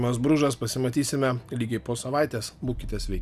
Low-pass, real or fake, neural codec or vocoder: 14.4 kHz; real; none